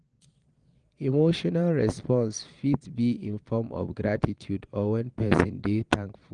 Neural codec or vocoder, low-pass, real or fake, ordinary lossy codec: vocoder, 22.05 kHz, 80 mel bands, Vocos; 9.9 kHz; fake; Opus, 24 kbps